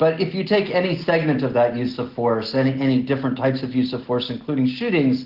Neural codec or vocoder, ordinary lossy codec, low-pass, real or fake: none; Opus, 16 kbps; 5.4 kHz; real